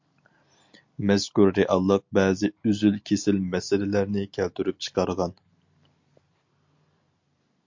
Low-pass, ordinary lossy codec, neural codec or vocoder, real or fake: 7.2 kHz; MP3, 48 kbps; none; real